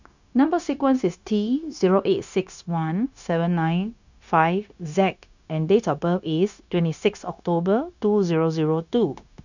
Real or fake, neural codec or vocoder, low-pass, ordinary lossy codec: fake; codec, 16 kHz, 0.9 kbps, LongCat-Audio-Codec; 7.2 kHz; none